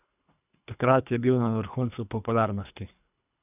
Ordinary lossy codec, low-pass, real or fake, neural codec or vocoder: none; 3.6 kHz; fake; codec, 24 kHz, 3 kbps, HILCodec